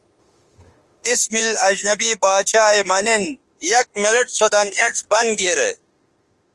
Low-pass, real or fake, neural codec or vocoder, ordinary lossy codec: 10.8 kHz; fake; autoencoder, 48 kHz, 32 numbers a frame, DAC-VAE, trained on Japanese speech; Opus, 24 kbps